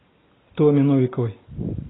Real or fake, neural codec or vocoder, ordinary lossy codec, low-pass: real; none; AAC, 16 kbps; 7.2 kHz